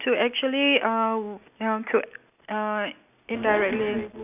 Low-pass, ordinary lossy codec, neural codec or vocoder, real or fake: 3.6 kHz; none; none; real